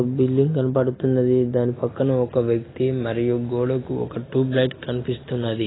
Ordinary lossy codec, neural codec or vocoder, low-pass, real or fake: AAC, 16 kbps; none; 7.2 kHz; real